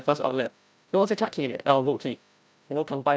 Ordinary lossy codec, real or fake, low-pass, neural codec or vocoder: none; fake; none; codec, 16 kHz, 0.5 kbps, FreqCodec, larger model